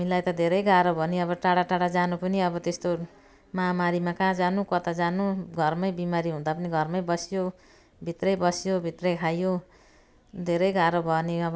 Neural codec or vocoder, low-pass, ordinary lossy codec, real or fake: none; none; none; real